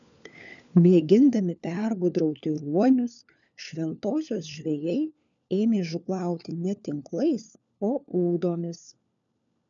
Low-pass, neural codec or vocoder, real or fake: 7.2 kHz; codec, 16 kHz, 4 kbps, FunCodec, trained on LibriTTS, 50 frames a second; fake